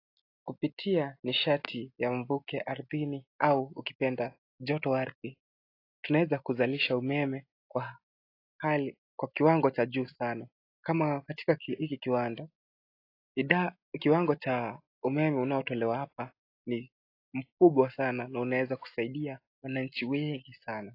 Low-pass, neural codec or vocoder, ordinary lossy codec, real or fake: 5.4 kHz; none; AAC, 32 kbps; real